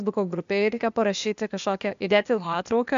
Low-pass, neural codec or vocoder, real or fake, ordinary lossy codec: 7.2 kHz; codec, 16 kHz, 0.8 kbps, ZipCodec; fake; MP3, 64 kbps